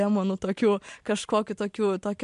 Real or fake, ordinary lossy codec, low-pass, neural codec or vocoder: real; MP3, 64 kbps; 10.8 kHz; none